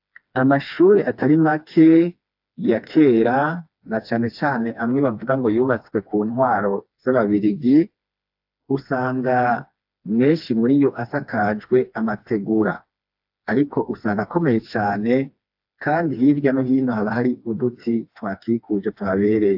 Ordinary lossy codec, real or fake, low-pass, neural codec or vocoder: AAC, 48 kbps; fake; 5.4 kHz; codec, 16 kHz, 2 kbps, FreqCodec, smaller model